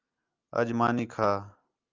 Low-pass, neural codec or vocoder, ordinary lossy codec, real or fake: 7.2 kHz; none; Opus, 24 kbps; real